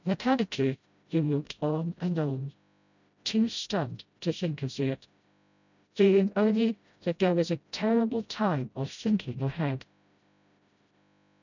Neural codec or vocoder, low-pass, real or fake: codec, 16 kHz, 0.5 kbps, FreqCodec, smaller model; 7.2 kHz; fake